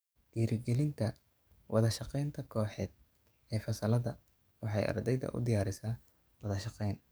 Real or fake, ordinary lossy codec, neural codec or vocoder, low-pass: fake; none; codec, 44.1 kHz, 7.8 kbps, DAC; none